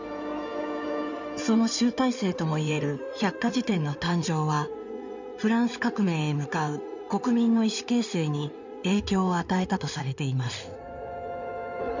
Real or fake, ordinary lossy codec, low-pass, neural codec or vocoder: fake; none; 7.2 kHz; codec, 16 kHz in and 24 kHz out, 2.2 kbps, FireRedTTS-2 codec